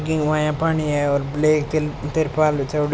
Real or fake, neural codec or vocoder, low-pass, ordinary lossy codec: real; none; none; none